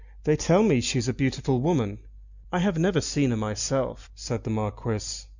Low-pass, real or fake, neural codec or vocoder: 7.2 kHz; real; none